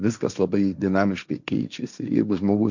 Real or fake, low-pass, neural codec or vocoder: fake; 7.2 kHz; codec, 16 kHz, 1.1 kbps, Voila-Tokenizer